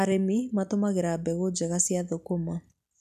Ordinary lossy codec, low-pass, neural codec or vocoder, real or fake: none; 14.4 kHz; none; real